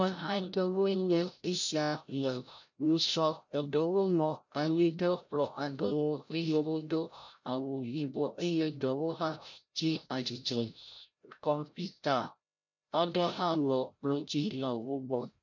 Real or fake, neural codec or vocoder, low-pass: fake; codec, 16 kHz, 0.5 kbps, FreqCodec, larger model; 7.2 kHz